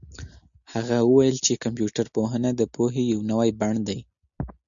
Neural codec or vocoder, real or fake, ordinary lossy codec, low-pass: none; real; MP3, 96 kbps; 7.2 kHz